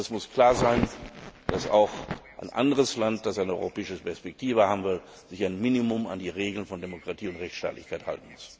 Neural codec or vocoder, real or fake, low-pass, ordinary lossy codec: none; real; none; none